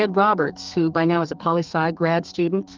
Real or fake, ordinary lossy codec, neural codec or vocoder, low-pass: fake; Opus, 32 kbps; codec, 32 kHz, 1.9 kbps, SNAC; 7.2 kHz